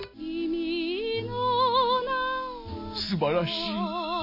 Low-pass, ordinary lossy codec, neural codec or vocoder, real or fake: 5.4 kHz; none; none; real